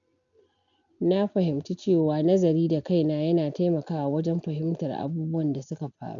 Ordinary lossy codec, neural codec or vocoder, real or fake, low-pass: none; none; real; 7.2 kHz